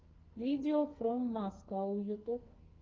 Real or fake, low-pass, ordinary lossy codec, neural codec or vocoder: fake; 7.2 kHz; Opus, 24 kbps; codec, 44.1 kHz, 2.6 kbps, SNAC